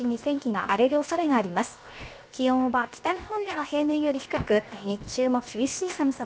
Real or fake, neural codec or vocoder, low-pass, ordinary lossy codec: fake; codec, 16 kHz, 0.7 kbps, FocalCodec; none; none